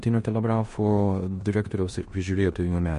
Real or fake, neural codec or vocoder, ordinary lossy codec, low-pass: fake; codec, 16 kHz in and 24 kHz out, 0.9 kbps, LongCat-Audio-Codec, four codebook decoder; MP3, 48 kbps; 10.8 kHz